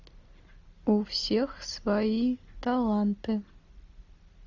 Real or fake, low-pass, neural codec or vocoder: real; 7.2 kHz; none